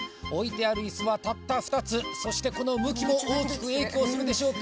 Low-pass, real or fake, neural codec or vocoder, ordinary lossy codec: none; real; none; none